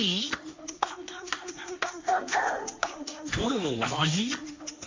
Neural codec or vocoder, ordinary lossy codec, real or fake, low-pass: codec, 16 kHz, 1.1 kbps, Voila-Tokenizer; MP3, 48 kbps; fake; 7.2 kHz